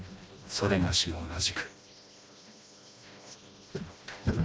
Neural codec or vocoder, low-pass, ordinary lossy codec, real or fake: codec, 16 kHz, 1 kbps, FreqCodec, smaller model; none; none; fake